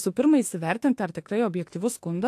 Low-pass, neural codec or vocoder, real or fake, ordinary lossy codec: 14.4 kHz; autoencoder, 48 kHz, 32 numbers a frame, DAC-VAE, trained on Japanese speech; fake; AAC, 64 kbps